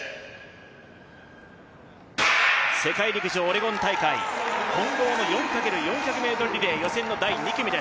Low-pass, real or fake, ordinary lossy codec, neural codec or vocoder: none; real; none; none